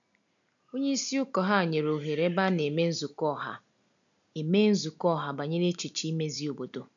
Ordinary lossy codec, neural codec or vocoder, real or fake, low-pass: none; none; real; 7.2 kHz